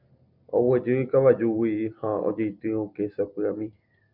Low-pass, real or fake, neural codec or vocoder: 5.4 kHz; real; none